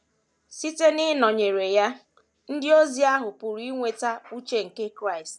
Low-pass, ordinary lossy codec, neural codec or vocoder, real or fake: none; none; none; real